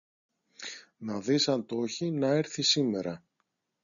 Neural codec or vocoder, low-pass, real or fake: none; 7.2 kHz; real